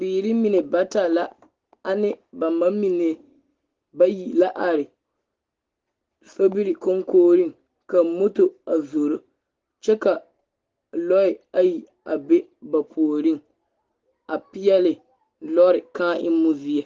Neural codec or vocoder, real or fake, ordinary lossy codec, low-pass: none; real; Opus, 16 kbps; 7.2 kHz